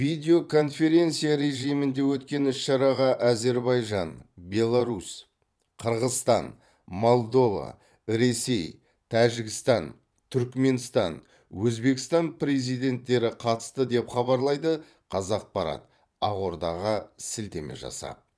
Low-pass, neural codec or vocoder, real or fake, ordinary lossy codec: none; vocoder, 22.05 kHz, 80 mel bands, Vocos; fake; none